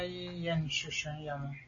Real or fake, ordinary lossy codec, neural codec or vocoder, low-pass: real; MP3, 32 kbps; none; 7.2 kHz